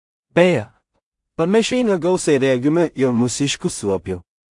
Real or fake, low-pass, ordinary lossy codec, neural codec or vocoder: fake; 10.8 kHz; AAC, 64 kbps; codec, 16 kHz in and 24 kHz out, 0.4 kbps, LongCat-Audio-Codec, two codebook decoder